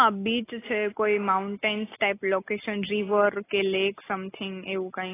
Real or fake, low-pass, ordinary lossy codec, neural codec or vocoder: real; 3.6 kHz; AAC, 16 kbps; none